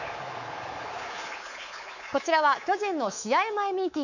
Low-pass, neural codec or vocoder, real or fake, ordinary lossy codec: 7.2 kHz; codec, 24 kHz, 3.1 kbps, DualCodec; fake; none